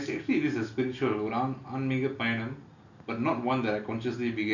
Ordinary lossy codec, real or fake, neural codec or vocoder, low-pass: none; real; none; 7.2 kHz